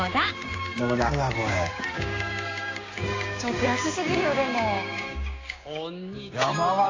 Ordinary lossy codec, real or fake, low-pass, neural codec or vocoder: AAC, 32 kbps; fake; 7.2 kHz; codec, 16 kHz, 6 kbps, DAC